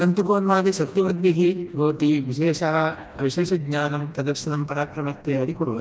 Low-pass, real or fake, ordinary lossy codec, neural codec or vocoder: none; fake; none; codec, 16 kHz, 1 kbps, FreqCodec, smaller model